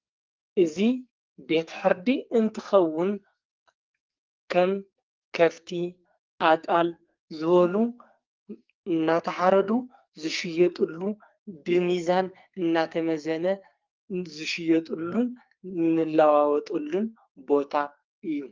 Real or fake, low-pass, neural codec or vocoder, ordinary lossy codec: fake; 7.2 kHz; codec, 44.1 kHz, 2.6 kbps, SNAC; Opus, 24 kbps